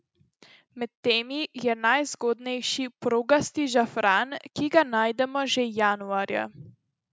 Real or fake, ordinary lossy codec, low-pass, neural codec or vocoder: real; none; none; none